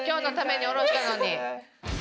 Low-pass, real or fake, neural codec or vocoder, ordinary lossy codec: none; real; none; none